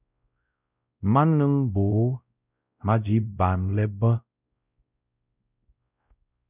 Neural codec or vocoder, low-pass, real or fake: codec, 16 kHz, 0.5 kbps, X-Codec, WavLM features, trained on Multilingual LibriSpeech; 3.6 kHz; fake